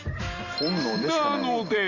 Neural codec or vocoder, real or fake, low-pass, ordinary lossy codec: none; real; 7.2 kHz; Opus, 64 kbps